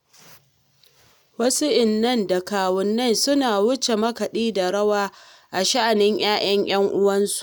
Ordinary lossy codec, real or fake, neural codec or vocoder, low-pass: none; real; none; none